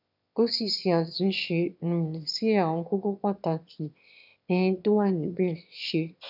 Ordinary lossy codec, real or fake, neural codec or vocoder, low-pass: none; fake; autoencoder, 22.05 kHz, a latent of 192 numbers a frame, VITS, trained on one speaker; 5.4 kHz